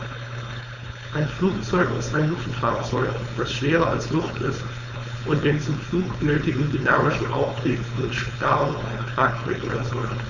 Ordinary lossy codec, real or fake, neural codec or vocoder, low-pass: none; fake; codec, 16 kHz, 4.8 kbps, FACodec; 7.2 kHz